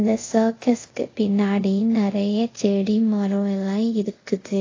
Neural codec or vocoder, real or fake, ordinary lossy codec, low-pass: codec, 24 kHz, 0.5 kbps, DualCodec; fake; AAC, 32 kbps; 7.2 kHz